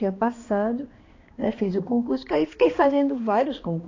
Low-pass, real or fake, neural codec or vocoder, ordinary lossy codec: 7.2 kHz; fake; codec, 16 kHz, 2 kbps, X-Codec, HuBERT features, trained on balanced general audio; AAC, 32 kbps